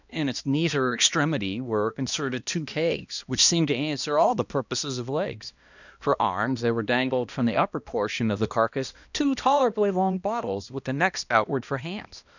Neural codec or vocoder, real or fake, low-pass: codec, 16 kHz, 1 kbps, X-Codec, HuBERT features, trained on balanced general audio; fake; 7.2 kHz